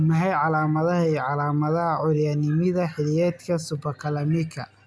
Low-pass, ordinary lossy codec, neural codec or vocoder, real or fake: none; none; none; real